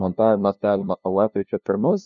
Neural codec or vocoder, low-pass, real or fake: codec, 16 kHz, 0.5 kbps, FunCodec, trained on LibriTTS, 25 frames a second; 7.2 kHz; fake